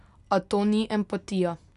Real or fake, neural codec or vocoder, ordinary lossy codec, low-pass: real; none; none; 10.8 kHz